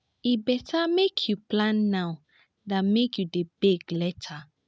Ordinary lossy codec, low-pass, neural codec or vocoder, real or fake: none; none; none; real